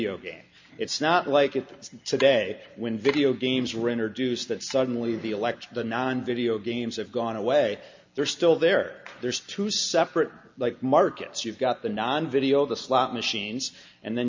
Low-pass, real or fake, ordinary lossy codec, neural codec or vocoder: 7.2 kHz; real; MP3, 48 kbps; none